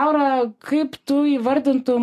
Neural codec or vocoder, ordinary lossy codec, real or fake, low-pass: none; AAC, 64 kbps; real; 14.4 kHz